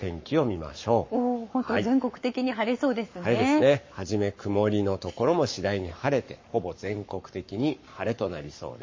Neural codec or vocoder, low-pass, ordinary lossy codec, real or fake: codec, 44.1 kHz, 7.8 kbps, Pupu-Codec; 7.2 kHz; MP3, 32 kbps; fake